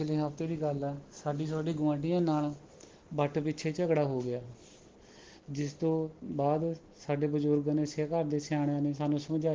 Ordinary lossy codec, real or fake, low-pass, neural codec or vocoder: Opus, 16 kbps; real; 7.2 kHz; none